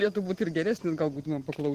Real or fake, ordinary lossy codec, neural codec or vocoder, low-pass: real; Opus, 16 kbps; none; 14.4 kHz